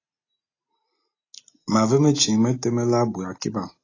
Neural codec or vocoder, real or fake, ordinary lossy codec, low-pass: none; real; AAC, 32 kbps; 7.2 kHz